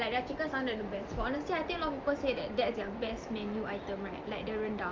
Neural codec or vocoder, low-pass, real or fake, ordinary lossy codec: none; 7.2 kHz; real; Opus, 24 kbps